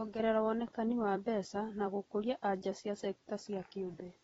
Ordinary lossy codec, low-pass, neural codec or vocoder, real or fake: AAC, 24 kbps; 19.8 kHz; vocoder, 44.1 kHz, 128 mel bands every 256 samples, BigVGAN v2; fake